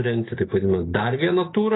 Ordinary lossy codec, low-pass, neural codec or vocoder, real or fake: AAC, 16 kbps; 7.2 kHz; none; real